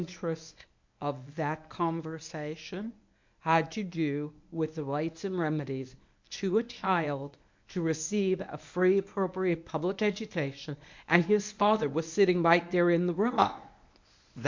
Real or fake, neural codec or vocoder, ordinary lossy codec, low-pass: fake; codec, 24 kHz, 0.9 kbps, WavTokenizer, medium speech release version 1; MP3, 64 kbps; 7.2 kHz